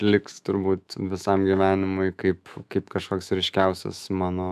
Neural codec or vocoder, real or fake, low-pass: autoencoder, 48 kHz, 128 numbers a frame, DAC-VAE, trained on Japanese speech; fake; 14.4 kHz